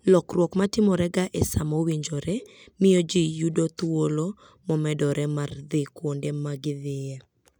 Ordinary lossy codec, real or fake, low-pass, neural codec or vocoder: none; real; 19.8 kHz; none